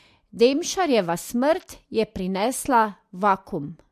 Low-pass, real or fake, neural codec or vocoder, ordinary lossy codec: 14.4 kHz; real; none; MP3, 64 kbps